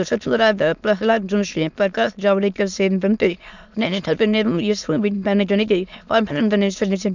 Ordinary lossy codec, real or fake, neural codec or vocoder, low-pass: none; fake; autoencoder, 22.05 kHz, a latent of 192 numbers a frame, VITS, trained on many speakers; 7.2 kHz